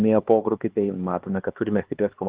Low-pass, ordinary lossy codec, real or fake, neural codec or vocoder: 3.6 kHz; Opus, 16 kbps; fake; codec, 16 kHz, 2 kbps, X-Codec, HuBERT features, trained on LibriSpeech